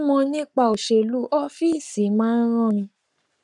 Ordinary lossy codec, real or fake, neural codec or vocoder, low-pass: none; fake; codec, 44.1 kHz, 7.8 kbps, Pupu-Codec; 10.8 kHz